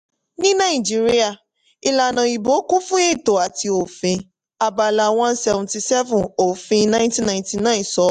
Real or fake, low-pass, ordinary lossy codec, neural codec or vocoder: real; 10.8 kHz; AAC, 96 kbps; none